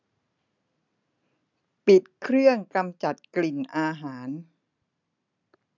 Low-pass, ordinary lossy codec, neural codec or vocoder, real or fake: 7.2 kHz; none; none; real